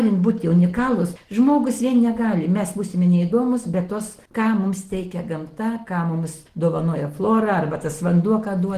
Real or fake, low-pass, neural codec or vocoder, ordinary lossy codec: real; 14.4 kHz; none; Opus, 16 kbps